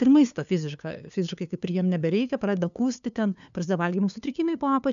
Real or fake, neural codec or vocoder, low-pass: fake; codec, 16 kHz, 2 kbps, FunCodec, trained on LibriTTS, 25 frames a second; 7.2 kHz